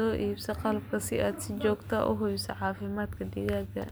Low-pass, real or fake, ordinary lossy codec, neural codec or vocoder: none; real; none; none